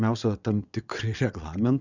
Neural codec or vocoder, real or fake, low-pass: vocoder, 22.05 kHz, 80 mel bands, WaveNeXt; fake; 7.2 kHz